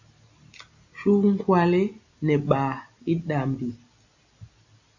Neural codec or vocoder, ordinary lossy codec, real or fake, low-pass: none; AAC, 48 kbps; real; 7.2 kHz